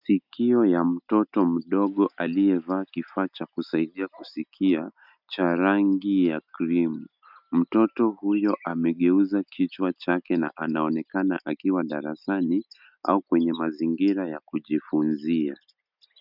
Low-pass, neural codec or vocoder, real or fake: 5.4 kHz; none; real